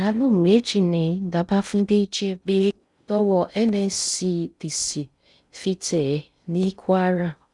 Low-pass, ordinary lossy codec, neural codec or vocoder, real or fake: 10.8 kHz; none; codec, 16 kHz in and 24 kHz out, 0.6 kbps, FocalCodec, streaming, 4096 codes; fake